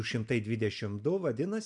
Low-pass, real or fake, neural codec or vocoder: 10.8 kHz; real; none